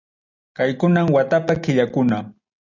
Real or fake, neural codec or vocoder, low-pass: real; none; 7.2 kHz